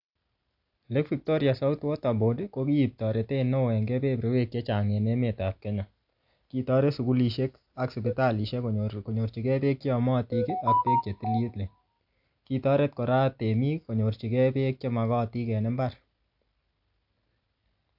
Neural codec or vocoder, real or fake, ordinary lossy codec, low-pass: none; real; AAC, 48 kbps; 5.4 kHz